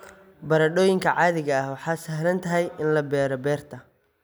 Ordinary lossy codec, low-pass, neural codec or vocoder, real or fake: none; none; none; real